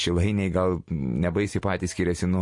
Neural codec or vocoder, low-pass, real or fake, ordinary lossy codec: none; 10.8 kHz; real; MP3, 48 kbps